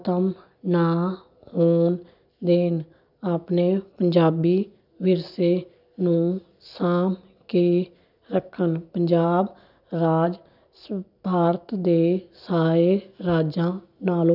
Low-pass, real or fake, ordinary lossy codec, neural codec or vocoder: 5.4 kHz; real; none; none